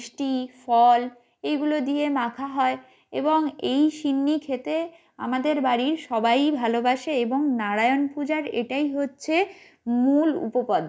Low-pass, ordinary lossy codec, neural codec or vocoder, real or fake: none; none; none; real